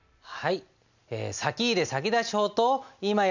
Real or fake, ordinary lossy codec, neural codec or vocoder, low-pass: real; none; none; 7.2 kHz